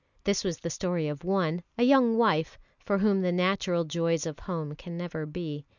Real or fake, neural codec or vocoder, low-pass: real; none; 7.2 kHz